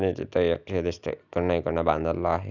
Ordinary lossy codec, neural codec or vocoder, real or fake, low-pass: none; none; real; 7.2 kHz